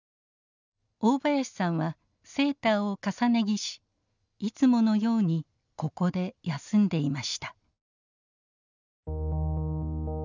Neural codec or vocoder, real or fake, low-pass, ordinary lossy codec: none; real; 7.2 kHz; none